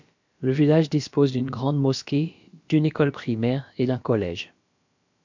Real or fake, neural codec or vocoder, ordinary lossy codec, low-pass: fake; codec, 16 kHz, about 1 kbps, DyCAST, with the encoder's durations; MP3, 64 kbps; 7.2 kHz